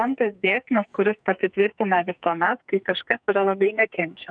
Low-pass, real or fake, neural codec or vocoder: 9.9 kHz; fake; codec, 44.1 kHz, 2.6 kbps, SNAC